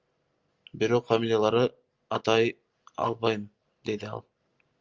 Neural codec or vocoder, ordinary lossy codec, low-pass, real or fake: none; Opus, 32 kbps; 7.2 kHz; real